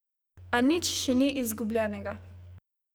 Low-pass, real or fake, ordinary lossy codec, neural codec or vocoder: none; fake; none; codec, 44.1 kHz, 2.6 kbps, SNAC